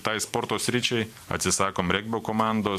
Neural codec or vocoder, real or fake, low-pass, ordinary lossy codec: vocoder, 44.1 kHz, 128 mel bands every 512 samples, BigVGAN v2; fake; 14.4 kHz; AAC, 96 kbps